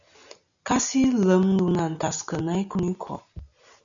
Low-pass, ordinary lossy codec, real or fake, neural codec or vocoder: 7.2 kHz; MP3, 96 kbps; real; none